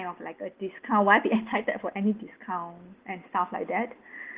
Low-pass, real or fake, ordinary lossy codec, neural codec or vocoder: 3.6 kHz; real; Opus, 16 kbps; none